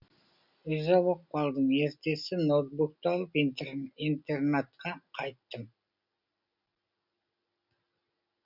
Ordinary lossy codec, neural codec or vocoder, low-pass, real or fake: none; none; 5.4 kHz; real